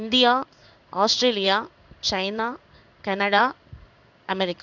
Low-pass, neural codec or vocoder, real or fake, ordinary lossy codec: 7.2 kHz; codec, 16 kHz in and 24 kHz out, 1 kbps, XY-Tokenizer; fake; none